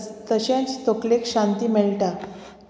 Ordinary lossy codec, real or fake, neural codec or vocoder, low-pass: none; real; none; none